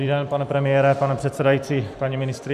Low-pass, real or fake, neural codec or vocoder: 14.4 kHz; fake; vocoder, 44.1 kHz, 128 mel bands every 256 samples, BigVGAN v2